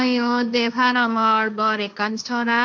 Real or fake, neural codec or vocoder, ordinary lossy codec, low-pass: fake; codec, 16 kHz, 1.1 kbps, Voila-Tokenizer; none; none